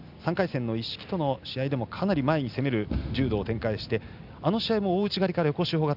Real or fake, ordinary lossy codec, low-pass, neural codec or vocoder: real; none; 5.4 kHz; none